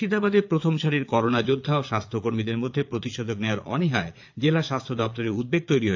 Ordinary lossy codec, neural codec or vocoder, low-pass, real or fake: AAC, 48 kbps; vocoder, 22.05 kHz, 80 mel bands, Vocos; 7.2 kHz; fake